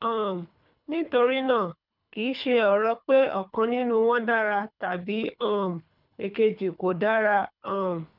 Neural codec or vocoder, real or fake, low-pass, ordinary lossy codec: codec, 24 kHz, 3 kbps, HILCodec; fake; 5.4 kHz; none